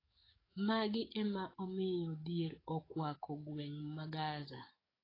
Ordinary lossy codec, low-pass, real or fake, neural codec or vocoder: AAC, 24 kbps; 5.4 kHz; fake; codec, 44.1 kHz, 7.8 kbps, DAC